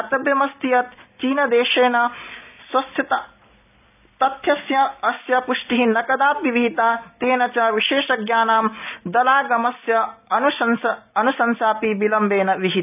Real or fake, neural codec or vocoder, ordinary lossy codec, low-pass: real; none; none; 3.6 kHz